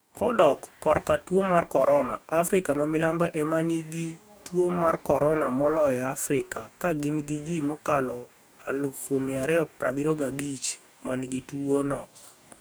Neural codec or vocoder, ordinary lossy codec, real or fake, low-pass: codec, 44.1 kHz, 2.6 kbps, DAC; none; fake; none